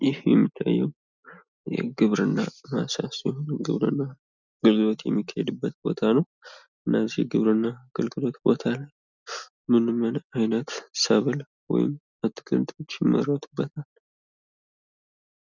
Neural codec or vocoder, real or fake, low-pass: none; real; 7.2 kHz